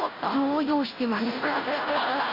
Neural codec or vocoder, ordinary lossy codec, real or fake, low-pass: codec, 16 kHz, 0.5 kbps, FunCodec, trained on Chinese and English, 25 frames a second; AAC, 24 kbps; fake; 5.4 kHz